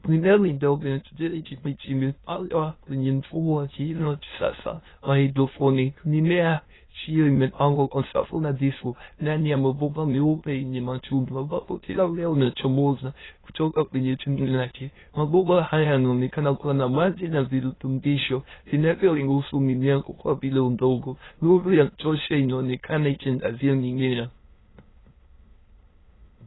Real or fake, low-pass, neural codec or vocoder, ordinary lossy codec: fake; 7.2 kHz; autoencoder, 22.05 kHz, a latent of 192 numbers a frame, VITS, trained on many speakers; AAC, 16 kbps